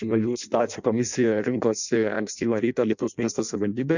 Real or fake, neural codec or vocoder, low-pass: fake; codec, 16 kHz in and 24 kHz out, 0.6 kbps, FireRedTTS-2 codec; 7.2 kHz